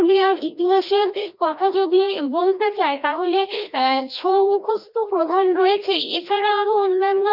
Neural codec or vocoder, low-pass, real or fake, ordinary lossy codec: codec, 16 kHz, 1 kbps, FreqCodec, larger model; 5.4 kHz; fake; none